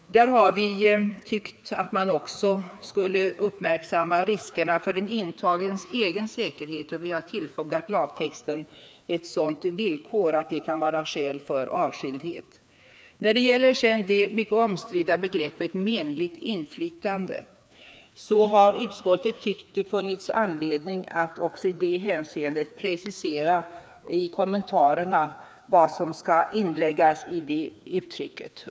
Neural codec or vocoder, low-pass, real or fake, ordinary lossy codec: codec, 16 kHz, 2 kbps, FreqCodec, larger model; none; fake; none